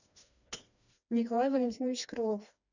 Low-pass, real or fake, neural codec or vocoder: 7.2 kHz; fake; codec, 16 kHz, 2 kbps, FreqCodec, smaller model